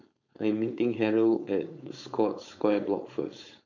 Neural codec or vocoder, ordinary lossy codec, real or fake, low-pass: codec, 16 kHz, 4.8 kbps, FACodec; none; fake; 7.2 kHz